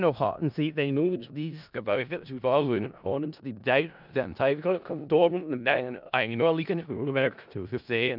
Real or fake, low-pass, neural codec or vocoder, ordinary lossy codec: fake; 5.4 kHz; codec, 16 kHz in and 24 kHz out, 0.4 kbps, LongCat-Audio-Codec, four codebook decoder; none